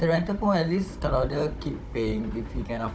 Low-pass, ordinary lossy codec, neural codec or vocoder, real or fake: none; none; codec, 16 kHz, 16 kbps, FunCodec, trained on Chinese and English, 50 frames a second; fake